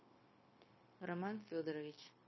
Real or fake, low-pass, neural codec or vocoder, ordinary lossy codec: fake; 7.2 kHz; codec, 16 kHz, 0.9 kbps, LongCat-Audio-Codec; MP3, 24 kbps